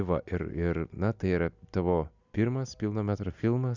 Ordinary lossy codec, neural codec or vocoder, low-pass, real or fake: Opus, 64 kbps; none; 7.2 kHz; real